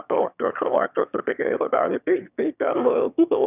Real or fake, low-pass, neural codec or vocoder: fake; 3.6 kHz; autoencoder, 22.05 kHz, a latent of 192 numbers a frame, VITS, trained on one speaker